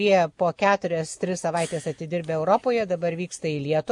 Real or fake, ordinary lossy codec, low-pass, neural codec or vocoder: real; MP3, 48 kbps; 10.8 kHz; none